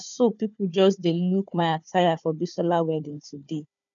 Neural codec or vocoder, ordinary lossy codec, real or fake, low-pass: codec, 16 kHz, 4 kbps, FunCodec, trained on Chinese and English, 50 frames a second; none; fake; 7.2 kHz